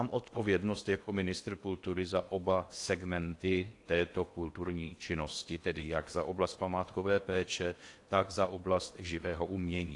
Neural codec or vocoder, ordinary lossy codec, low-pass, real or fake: codec, 16 kHz in and 24 kHz out, 0.8 kbps, FocalCodec, streaming, 65536 codes; AAC, 48 kbps; 10.8 kHz; fake